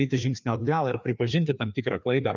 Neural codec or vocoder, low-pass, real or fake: codec, 16 kHz, 2 kbps, FreqCodec, larger model; 7.2 kHz; fake